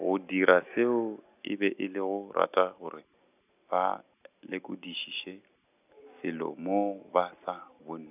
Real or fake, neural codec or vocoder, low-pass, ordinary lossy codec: real; none; 3.6 kHz; none